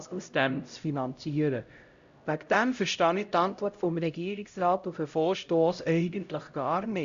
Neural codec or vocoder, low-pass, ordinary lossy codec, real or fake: codec, 16 kHz, 0.5 kbps, X-Codec, HuBERT features, trained on LibriSpeech; 7.2 kHz; Opus, 64 kbps; fake